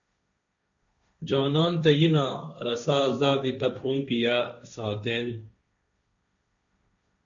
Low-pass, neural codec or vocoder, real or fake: 7.2 kHz; codec, 16 kHz, 1.1 kbps, Voila-Tokenizer; fake